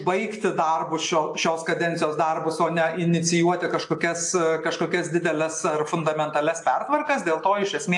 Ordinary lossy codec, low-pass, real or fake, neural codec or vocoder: AAC, 64 kbps; 10.8 kHz; real; none